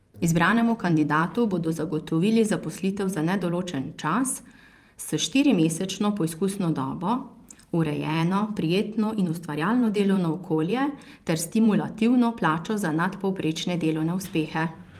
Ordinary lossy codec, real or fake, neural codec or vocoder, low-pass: Opus, 32 kbps; fake; vocoder, 44.1 kHz, 128 mel bands every 512 samples, BigVGAN v2; 14.4 kHz